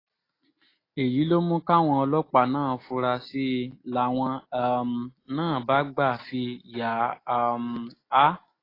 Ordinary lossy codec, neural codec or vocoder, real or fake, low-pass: AAC, 24 kbps; none; real; 5.4 kHz